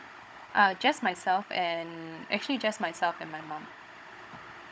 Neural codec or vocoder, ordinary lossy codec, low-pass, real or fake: codec, 16 kHz, 16 kbps, FunCodec, trained on Chinese and English, 50 frames a second; none; none; fake